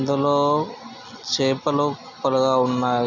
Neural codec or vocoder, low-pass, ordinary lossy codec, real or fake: none; 7.2 kHz; none; real